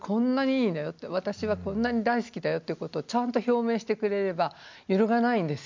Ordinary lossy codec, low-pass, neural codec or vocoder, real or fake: MP3, 64 kbps; 7.2 kHz; none; real